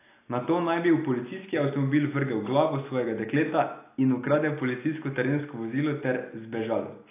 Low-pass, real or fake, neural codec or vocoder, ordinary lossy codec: 3.6 kHz; real; none; AAC, 24 kbps